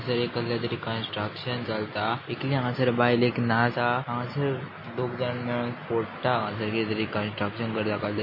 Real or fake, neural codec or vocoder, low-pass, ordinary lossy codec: real; none; 5.4 kHz; MP3, 24 kbps